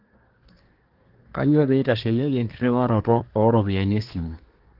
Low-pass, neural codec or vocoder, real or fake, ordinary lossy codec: 5.4 kHz; codec, 24 kHz, 1 kbps, SNAC; fake; Opus, 16 kbps